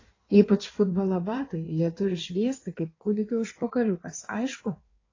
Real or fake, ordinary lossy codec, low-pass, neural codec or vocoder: fake; AAC, 32 kbps; 7.2 kHz; codec, 16 kHz in and 24 kHz out, 1.1 kbps, FireRedTTS-2 codec